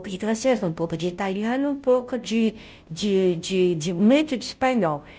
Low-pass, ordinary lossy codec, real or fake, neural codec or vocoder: none; none; fake; codec, 16 kHz, 0.5 kbps, FunCodec, trained on Chinese and English, 25 frames a second